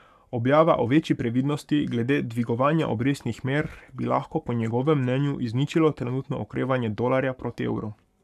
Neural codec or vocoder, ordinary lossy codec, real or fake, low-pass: codec, 44.1 kHz, 7.8 kbps, Pupu-Codec; none; fake; 14.4 kHz